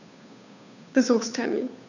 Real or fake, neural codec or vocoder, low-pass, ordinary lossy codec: fake; codec, 16 kHz, 2 kbps, FunCodec, trained on Chinese and English, 25 frames a second; 7.2 kHz; none